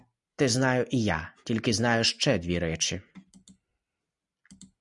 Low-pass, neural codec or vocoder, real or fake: 10.8 kHz; none; real